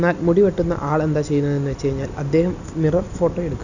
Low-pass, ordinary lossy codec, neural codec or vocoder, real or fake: 7.2 kHz; none; none; real